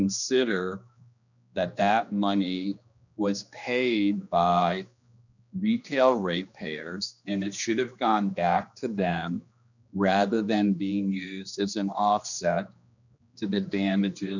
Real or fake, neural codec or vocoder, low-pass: fake; codec, 16 kHz, 2 kbps, X-Codec, HuBERT features, trained on general audio; 7.2 kHz